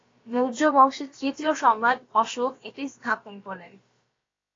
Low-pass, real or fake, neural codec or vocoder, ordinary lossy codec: 7.2 kHz; fake; codec, 16 kHz, about 1 kbps, DyCAST, with the encoder's durations; AAC, 32 kbps